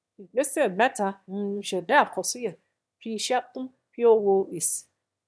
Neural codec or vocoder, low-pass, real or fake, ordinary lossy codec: autoencoder, 22.05 kHz, a latent of 192 numbers a frame, VITS, trained on one speaker; none; fake; none